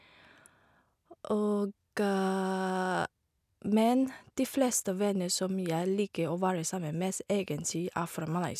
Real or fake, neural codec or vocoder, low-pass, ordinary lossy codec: real; none; 14.4 kHz; none